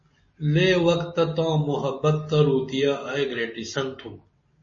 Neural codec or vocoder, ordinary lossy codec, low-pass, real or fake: none; MP3, 32 kbps; 7.2 kHz; real